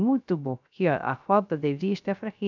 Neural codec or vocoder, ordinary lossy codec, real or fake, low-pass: codec, 16 kHz, 0.3 kbps, FocalCodec; none; fake; 7.2 kHz